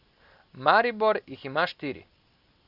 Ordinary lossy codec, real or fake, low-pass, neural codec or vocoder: Opus, 64 kbps; real; 5.4 kHz; none